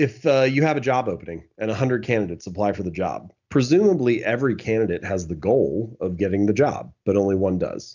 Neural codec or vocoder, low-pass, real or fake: none; 7.2 kHz; real